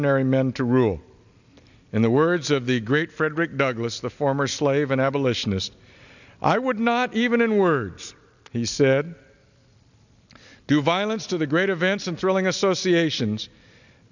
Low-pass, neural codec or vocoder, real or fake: 7.2 kHz; none; real